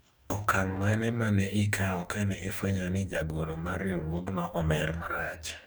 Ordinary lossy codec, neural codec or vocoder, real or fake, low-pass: none; codec, 44.1 kHz, 2.6 kbps, DAC; fake; none